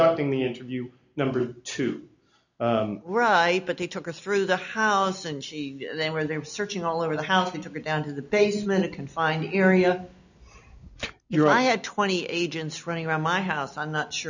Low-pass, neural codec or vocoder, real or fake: 7.2 kHz; none; real